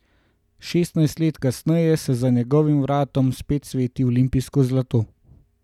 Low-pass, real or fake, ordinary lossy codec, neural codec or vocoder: 19.8 kHz; real; none; none